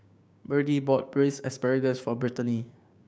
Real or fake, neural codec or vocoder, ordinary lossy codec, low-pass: fake; codec, 16 kHz, 6 kbps, DAC; none; none